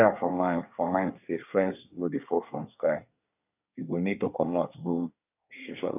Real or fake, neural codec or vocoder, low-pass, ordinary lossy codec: fake; codec, 24 kHz, 1 kbps, SNAC; 3.6 kHz; none